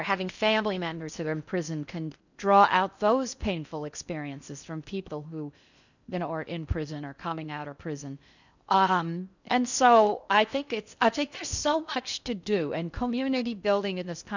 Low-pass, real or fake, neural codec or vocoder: 7.2 kHz; fake; codec, 16 kHz in and 24 kHz out, 0.6 kbps, FocalCodec, streaming, 4096 codes